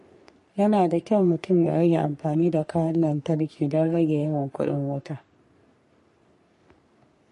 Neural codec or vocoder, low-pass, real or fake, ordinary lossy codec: codec, 44.1 kHz, 3.4 kbps, Pupu-Codec; 14.4 kHz; fake; MP3, 48 kbps